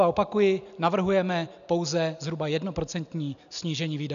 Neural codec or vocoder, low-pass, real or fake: none; 7.2 kHz; real